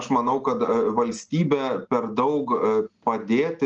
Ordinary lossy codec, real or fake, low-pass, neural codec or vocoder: Opus, 24 kbps; real; 7.2 kHz; none